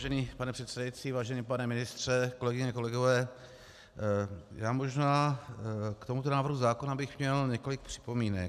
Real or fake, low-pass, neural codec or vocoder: real; 14.4 kHz; none